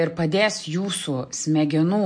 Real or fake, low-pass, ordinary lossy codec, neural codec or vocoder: real; 9.9 kHz; MP3, 48 kbps; none